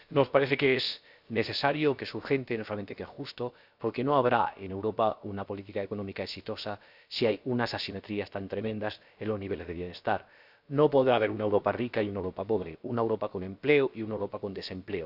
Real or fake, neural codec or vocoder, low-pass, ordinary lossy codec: fake; codec, 16 kHz, about 1 kbps, DyCAST, with the encoder's durations; 5.4 kHz; none